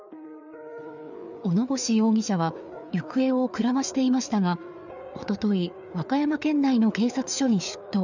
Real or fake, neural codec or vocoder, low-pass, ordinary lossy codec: fake; codec, 16 kHz, 4 kbps, FreqCodec, larger model; 7.2 kHz; none